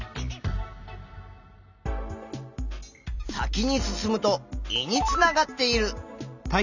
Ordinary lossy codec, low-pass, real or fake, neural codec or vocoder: none; 7.2 kHz; real; none